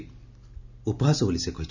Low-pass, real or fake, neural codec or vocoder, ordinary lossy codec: 7.2 kHz; real; none; none